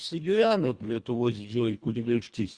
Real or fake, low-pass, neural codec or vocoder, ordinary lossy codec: fake; 9.9 kHz; codec, 24 kHz, 1.5 kbps, HILCodec; Opus, 64 kbps